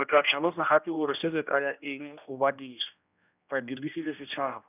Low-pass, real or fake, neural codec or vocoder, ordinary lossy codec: 3.6 kHz; fake; codec, 16 kHz, 1 kbps, X-Codec, HuBERT features, trained on general audio; none